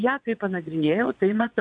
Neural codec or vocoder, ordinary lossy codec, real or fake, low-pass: vocoder, 22.05 kHz, 80 mel bands, WaveNeXt; AAC, 64 kbps; fake; 9.9 kHz